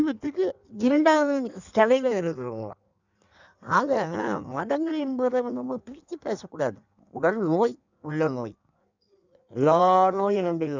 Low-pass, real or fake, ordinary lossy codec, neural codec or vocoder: 7.2 kHz; fake; none; codec, 16 kHz in and 24 kHz out, 1.1 kbps, FireRedTTS-2 codec